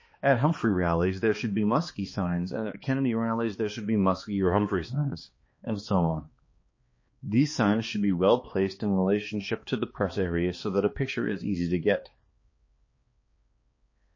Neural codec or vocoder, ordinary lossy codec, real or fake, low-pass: codec, 16 kHz, 2 kbps, X-Codec, HuBERT features, trained on balanced general audio; MP3, 32 kbps; fake; 7.2 kHz